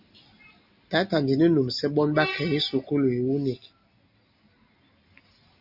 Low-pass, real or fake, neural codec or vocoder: 5.4 kHz; real; none